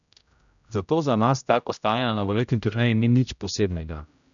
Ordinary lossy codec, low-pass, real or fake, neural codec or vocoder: none; 7.2 kHz; fake; codec, 16 kHz, 0.5 kbps, X-Codec, HuBERT features, trained on general audio